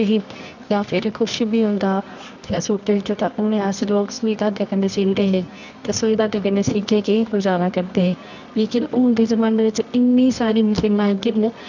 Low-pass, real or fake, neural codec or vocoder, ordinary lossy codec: 7.2 kHz; fake; codec, 24 kHz, 0.9 kbps, WavTokenizer, medium music audio release; none